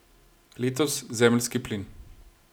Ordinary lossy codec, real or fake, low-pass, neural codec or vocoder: none; real; none; none